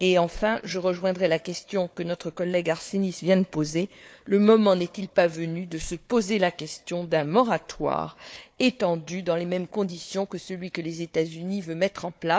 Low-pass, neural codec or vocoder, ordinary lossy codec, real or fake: none; codec, 16 kHz, 4 kbps, FunCodec, trained on Chinese and English, 50 frames a second; none; fake